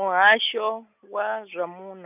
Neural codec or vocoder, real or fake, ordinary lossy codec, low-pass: none; real; none; 3.6 kHz